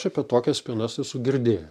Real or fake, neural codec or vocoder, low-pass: real; none; 14.4 kHz